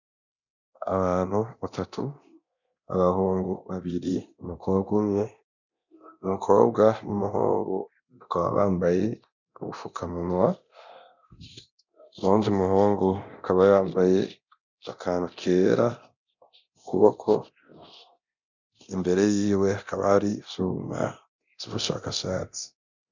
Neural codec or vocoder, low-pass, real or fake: codec, 24 kHz, 0.9 kbps, DualCodec; 7.2 kHz; fake